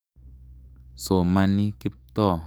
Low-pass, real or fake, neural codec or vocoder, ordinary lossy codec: none; fake; codec, 44.1 kHz, 7.8 kbps, DAC; none